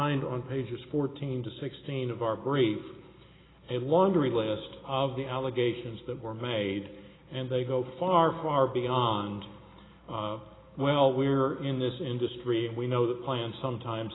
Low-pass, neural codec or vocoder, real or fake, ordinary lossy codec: 7.2 kHz; none; real; AAC, 16 kbps